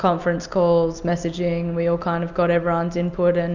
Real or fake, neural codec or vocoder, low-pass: real; none; 7.2 kHz